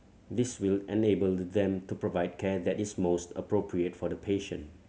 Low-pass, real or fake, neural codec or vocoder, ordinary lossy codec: none; real; none; none